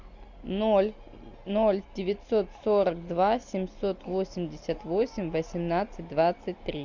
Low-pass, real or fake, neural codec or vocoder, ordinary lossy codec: 7.2 kHz; real; none; MP3, 64 kbps